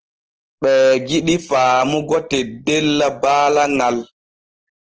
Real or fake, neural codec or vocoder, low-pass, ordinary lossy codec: real; none; 7.2 kHz; Opus, 16 kbps